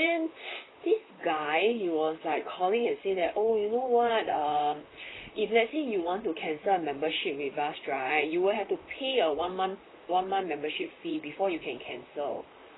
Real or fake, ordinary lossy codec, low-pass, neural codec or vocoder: fake; AAC, 16 kbps; 7.2 kHz; vocoder, 44.1 kHz, 128 mel bands, Pupu-Vocoder